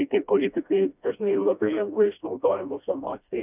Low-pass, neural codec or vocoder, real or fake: 3.6 kHz; codec, 16 kHz, 1 kbps, FreqCodec, smaller model; fake